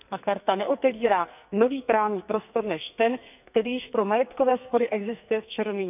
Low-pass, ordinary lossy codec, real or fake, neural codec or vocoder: 3.6 kHz; none; fake; codec, 44.1 kHz, 2.6 kbps, SNAC